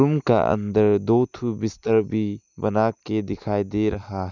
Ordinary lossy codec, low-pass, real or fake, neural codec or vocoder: none; 7.2 kHz; real; none